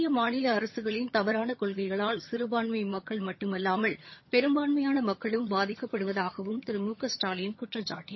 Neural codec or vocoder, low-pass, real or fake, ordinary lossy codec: vocoder, 22.05 kHz, 80 mel bands, HiFi-GAN; 7.2 kHz; fake; MP3, 24 kbps